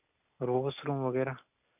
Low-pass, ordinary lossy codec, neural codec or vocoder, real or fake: 3.6 kHz; none; none; real